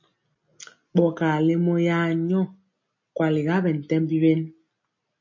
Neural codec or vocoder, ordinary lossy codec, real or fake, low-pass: none; MP3, 32 kbps; real; 7.2 kHz